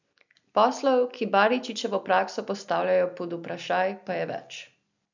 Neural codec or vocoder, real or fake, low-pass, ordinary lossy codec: none; real; 7.2 kHz; none